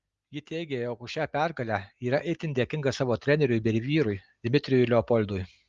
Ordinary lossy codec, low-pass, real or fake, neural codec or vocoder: Opus, 32 kbps; 7.2 kHz; real; none